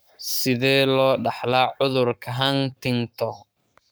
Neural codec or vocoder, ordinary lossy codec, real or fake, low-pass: codec, 44.1 kHz, 7.8 kbps, Pupu-Codec; none; fake; none